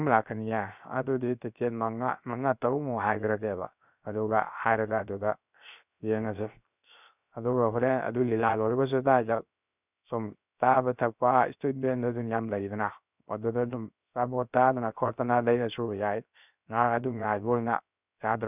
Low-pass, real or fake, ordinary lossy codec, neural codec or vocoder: 3.6 kHz; fake; none; codec, 16 kHz, 0.3 kbps, FocalCodec